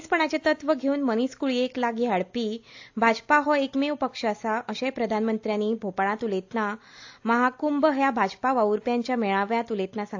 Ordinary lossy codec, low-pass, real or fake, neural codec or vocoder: AAC, 48 kbps; 7.2 kHz; real; none